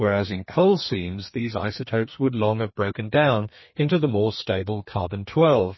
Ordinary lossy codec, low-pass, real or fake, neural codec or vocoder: MP3, 24 kbps; 7.2 kHz; fake; codec, 32 kHz, 1.9 kbps, SNAC